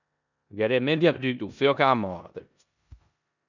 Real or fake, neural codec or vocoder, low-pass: fake; codec, 16 kHz in and 24 kHz out, 0.9 kbps, LongCat-Audio-Codec, four codebook decoder; 7.2 kHz